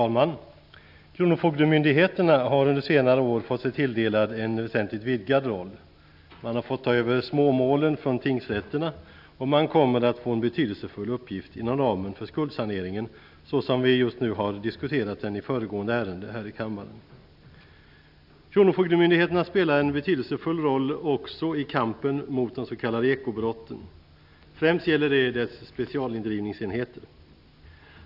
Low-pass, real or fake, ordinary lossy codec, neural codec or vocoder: 5.4 kHz; real; none; none